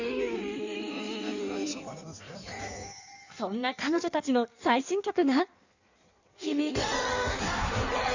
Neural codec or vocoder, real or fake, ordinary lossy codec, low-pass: codec, 16 kHz in and 24 kHz out, 1.1 kbps, FireRedTTS-2 codec; fake; none; 7.2 kHz